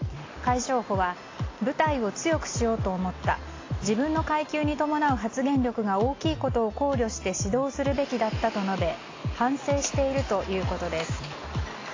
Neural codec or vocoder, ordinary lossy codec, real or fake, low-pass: none; AAC, 32 kbps; real; 7.2 kHz